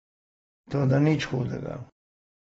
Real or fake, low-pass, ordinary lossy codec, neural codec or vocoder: real; 14.4 kHz; AAC, 24 kbps; none